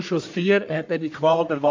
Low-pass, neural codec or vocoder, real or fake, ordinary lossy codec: 7.2 kHz; codec, 44.1 kHz, 1.7 kbps, Pupu-Codec; fake; MP3, 48 kbps